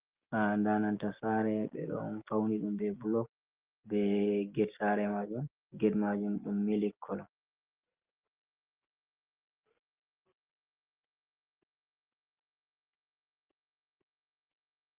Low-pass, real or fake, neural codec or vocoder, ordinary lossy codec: 3.6 kHz; real; none; Opus, 32 kbps